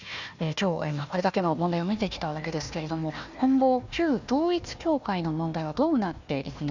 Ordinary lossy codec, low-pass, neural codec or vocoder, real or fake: none; 7.2 kHz; codec, 16 kHz, 1 kbps, FunCodec, trained on Chinese and English, 50 frames a second; fake